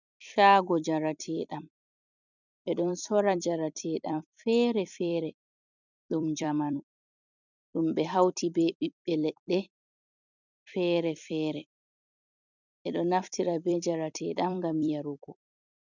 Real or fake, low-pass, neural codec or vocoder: fake; 7.2 kHz; vocoder, 44.1 kHz, 128 mel bands every 256 samples, BigVGAN v2